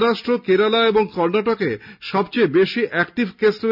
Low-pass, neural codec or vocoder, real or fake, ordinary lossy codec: 5.4 kHz; none; real; none